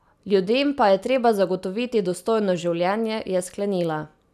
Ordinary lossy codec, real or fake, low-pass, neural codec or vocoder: none; real; 14.4 kHz; none